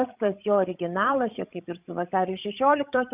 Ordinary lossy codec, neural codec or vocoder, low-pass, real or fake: Opus, 64 kbps; codec, 16 kHz, 16 kbps, FreqCodec, larger model; 3.6 kHz; fake